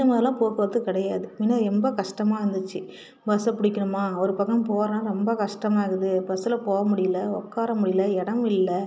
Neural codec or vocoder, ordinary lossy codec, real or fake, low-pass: none; none; real; none